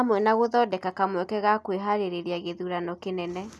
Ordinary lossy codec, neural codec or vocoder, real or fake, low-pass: none; none; real; none